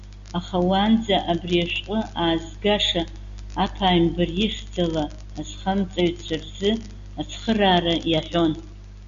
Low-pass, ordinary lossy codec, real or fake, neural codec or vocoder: 7.2 kHz; MP3, 96 kbps; real; none